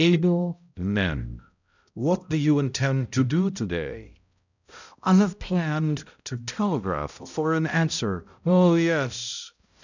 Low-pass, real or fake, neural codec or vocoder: 7.2 kHz; fake; codec, 16 kHz, 0.5 kbps, X-Codec, HuBERT features, trained on balanced general audio